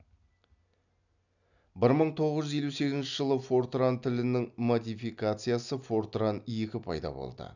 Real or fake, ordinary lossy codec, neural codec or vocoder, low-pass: real; none; none; 7.2 kHz